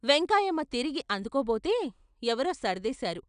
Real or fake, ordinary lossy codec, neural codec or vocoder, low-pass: real; none; none; 9.9 kHz